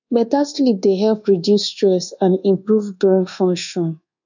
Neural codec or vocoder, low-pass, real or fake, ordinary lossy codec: codec, 24 kHz, 1.2 kbps, DualCodec; 7.2 kHz; fake; none